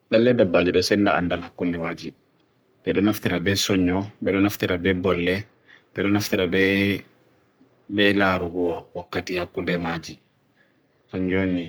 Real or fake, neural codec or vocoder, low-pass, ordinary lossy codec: fake; codec, 44.1 kHz, 3.4 kbps, Pupu-Codec; none; none